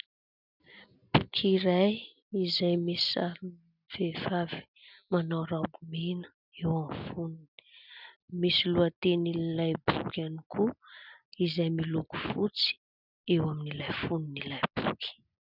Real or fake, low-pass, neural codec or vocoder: real; 5.4 kHz; none